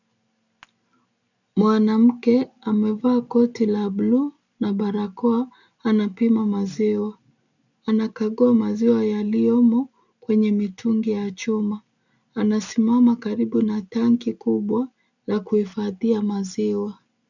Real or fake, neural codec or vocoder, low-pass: real; none; 7.2 kHz